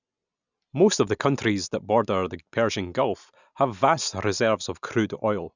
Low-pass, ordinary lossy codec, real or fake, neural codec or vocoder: 7.2 kHz; none; real; none